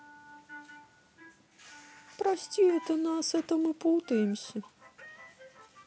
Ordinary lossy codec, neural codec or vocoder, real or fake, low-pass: none; none; real; none